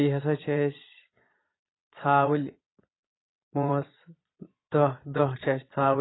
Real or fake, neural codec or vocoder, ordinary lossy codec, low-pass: fake; vocoder, 22.05 kHz, 80 mel bands, Vocos; AAC, 16 kbps; 7.2 kHz